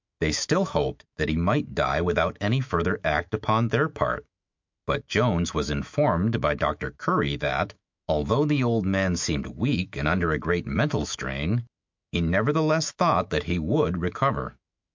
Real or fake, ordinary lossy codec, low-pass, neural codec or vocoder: fake; MP3, 64 kbps; 7.2 kHz; autoencoder, 48 kHz, 128 numbers a frame, DAC-VAE, trained on Japanese speech